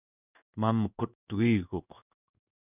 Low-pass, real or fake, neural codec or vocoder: 3.6 kHz; fake; codec, 16 kHz in and 24 kHz out, 1 kbps, XY-Tokenizer